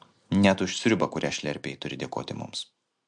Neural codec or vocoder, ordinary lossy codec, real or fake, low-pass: none; MP3, 64 kbps; real; 9.9 kHz